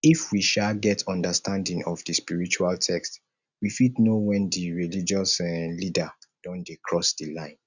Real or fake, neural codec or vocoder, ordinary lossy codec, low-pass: real; none; none; 7.2 kHz